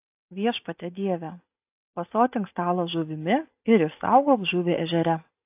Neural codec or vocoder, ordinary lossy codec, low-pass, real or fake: none; AAC, 32 kbps; 3.6 kHz; real